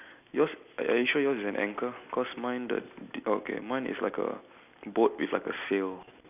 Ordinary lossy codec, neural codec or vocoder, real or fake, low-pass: none; none; real; 3.6 kHz